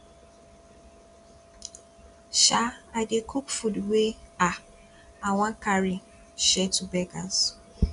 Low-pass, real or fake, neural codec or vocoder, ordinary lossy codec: 10.8 kHz; real; none; none